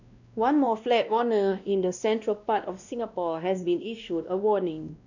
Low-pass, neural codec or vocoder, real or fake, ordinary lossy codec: 7.2 kHz; codec, 16 kHz, 1 kbps, X-Codec, WavLM features, trained on Multilingual LibriSpeech; fake; none